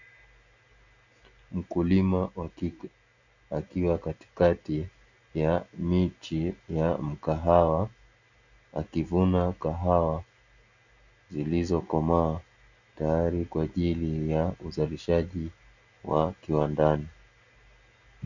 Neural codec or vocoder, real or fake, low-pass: none; real; 7.2 kHz